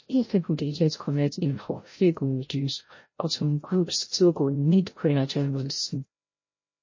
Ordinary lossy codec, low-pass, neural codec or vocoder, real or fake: MP3, 32 kbps; 7.2 kHz; codec, 16 kHz, 0.5 kbps, FreqCodec, larger model; fake